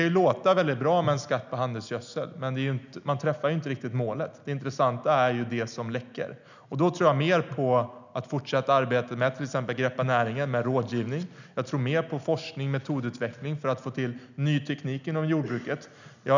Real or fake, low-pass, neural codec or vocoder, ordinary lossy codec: real; 7.2 kHz; none; none